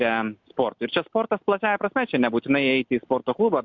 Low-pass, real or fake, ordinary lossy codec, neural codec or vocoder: 7.2 kHz; real; MP3, 64 kbps; none